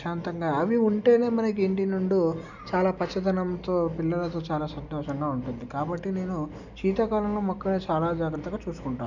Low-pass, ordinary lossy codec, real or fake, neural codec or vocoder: 7.2 kHz; none; real; none